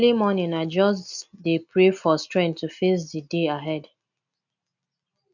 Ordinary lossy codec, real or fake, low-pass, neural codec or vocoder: none; real; 7.2 kHz; none